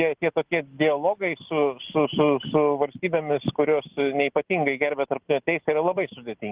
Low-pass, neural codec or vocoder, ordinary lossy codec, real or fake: 3.6 kHz; none; Opus, 16 kbps; real